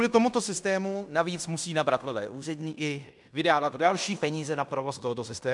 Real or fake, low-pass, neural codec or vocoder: fake; 10.8 kHz; codec, 16 kHz in and 24 kHz out, 0.9 kbps, LongCat-Audio-Codec, fine tuned four codebook decoder